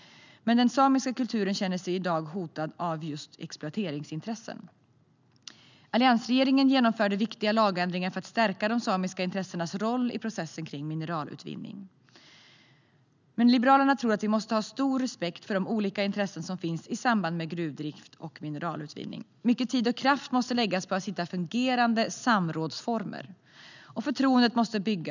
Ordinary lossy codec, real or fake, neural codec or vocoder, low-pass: none; real; none; 7.2 kHz